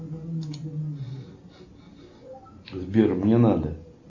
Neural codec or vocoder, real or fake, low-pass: none; real; 7.2 kHz